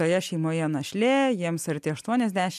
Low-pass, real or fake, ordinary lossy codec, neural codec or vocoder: 14.4 kHz; real; AAC, 96 kbps; none